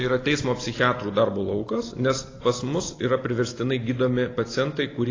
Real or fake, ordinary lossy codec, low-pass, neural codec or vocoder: real; AAC, 32 kbps; 7.2 kHz; none